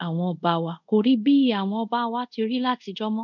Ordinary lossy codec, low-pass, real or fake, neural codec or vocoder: none; 7.2 kHz; fake; codec, 24 kHz, 0.5 kbps, DualCodec